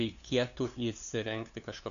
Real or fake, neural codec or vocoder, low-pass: fake; codec, 16 kHz, 2 kbps, FunCodec, trained on LibriTTS, 25 frames a second; 7.2 kHz